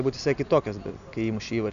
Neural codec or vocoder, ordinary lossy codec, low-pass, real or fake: none; MP3, 96 kbps; 7.2 kHz; real